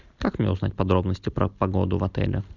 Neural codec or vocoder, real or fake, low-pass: none; real; 7.2 kHz